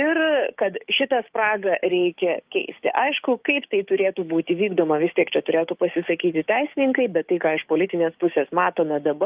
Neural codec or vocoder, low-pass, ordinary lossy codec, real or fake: codec, 16 kHz, 6 kbps, DAC; 3.6 kHz; Opus, 32 kbps; fake